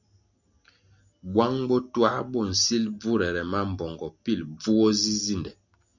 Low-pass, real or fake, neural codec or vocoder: 7.2 kHz; real; none